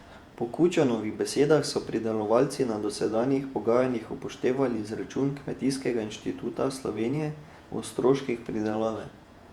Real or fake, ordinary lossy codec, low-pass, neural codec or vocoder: fake; Opus, 64 kbps; 19.8 kHz; vocoder, 48 kHz, 128 mel bands, Vocos